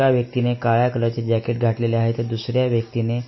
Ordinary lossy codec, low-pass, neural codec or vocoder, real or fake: MP3, 24 kbps; 7.2 kHz; none; real